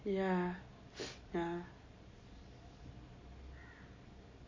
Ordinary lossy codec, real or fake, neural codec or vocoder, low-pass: MP3, 32 kbps; real; none; 7.2 kHz